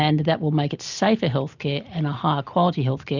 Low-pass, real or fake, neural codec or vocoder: 7.2 kHz; real; none